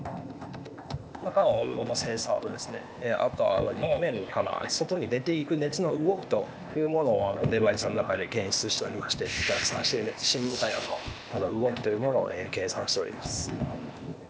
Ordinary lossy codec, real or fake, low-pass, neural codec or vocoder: none; fake; none; codec, 16 kHz, 0.8 kbps, ZipCodec